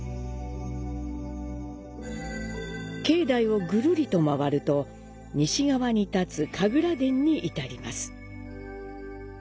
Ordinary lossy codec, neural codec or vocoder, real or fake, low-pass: none; none; real; none